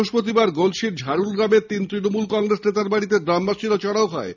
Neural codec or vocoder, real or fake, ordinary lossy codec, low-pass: none; real; none; 7.2 kHz